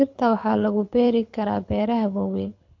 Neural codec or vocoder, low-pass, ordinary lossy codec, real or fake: codec, 24 kHz, 6 kbps, HILCodec; 7.2 kHz; MP3, 48 kbps; fake